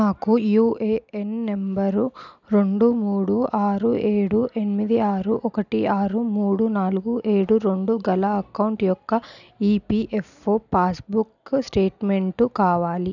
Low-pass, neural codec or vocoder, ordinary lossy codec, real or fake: 7.2 kHz; none; none; real